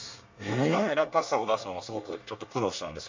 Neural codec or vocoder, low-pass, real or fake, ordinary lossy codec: codec, 24 kHz, 1 kbps, SNAC; 7.2 kHz; fake; MP3, 64 kbps